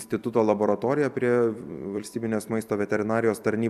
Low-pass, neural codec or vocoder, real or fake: 14.4 kHz; vocoder, 44.1 kHz, 128 mel bands every 256 samples, BigVGAN v2; fake